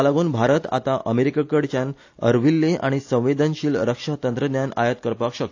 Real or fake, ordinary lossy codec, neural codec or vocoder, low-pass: real; AAC, 48 kbps; none; 7.2 kHz